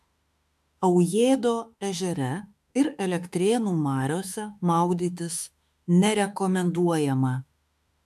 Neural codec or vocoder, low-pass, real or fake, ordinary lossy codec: autoencoder, 48 kHz, 32 numbers a frame, DAC-VAE, trained on Japanese speech; 14.4 kHz; fake; AAC, 96 kbps